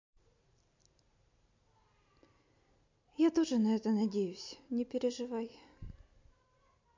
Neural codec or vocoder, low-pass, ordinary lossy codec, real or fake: none; 7.2 kHz; MP3, 48 kbps; real